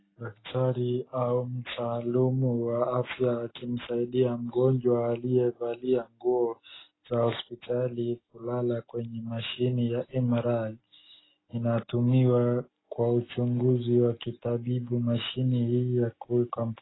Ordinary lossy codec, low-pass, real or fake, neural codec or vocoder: AAC, 16 kbps; 7.2 kHz; real; none